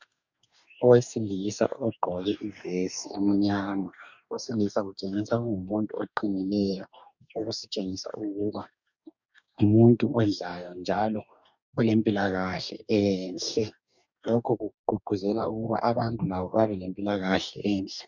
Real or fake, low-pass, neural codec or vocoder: fake; 7.2 kHz; codec, 44.1 kHz, 2.6 kbps, DAC